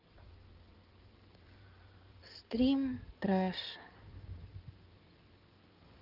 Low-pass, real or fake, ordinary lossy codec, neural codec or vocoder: 5.4 kHz; fake; Opus, 24 kbps; codec, 16 kHz in and 24 kHz out, 2.2 kbps, FireRedTTS-2 codec